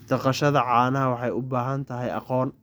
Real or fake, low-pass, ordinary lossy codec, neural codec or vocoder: real; none; none; none